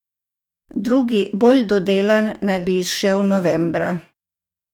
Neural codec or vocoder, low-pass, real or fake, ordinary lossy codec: codec, 44.1 kHz, 2.6 kbps, DAC; 19.8 kHz; fake; none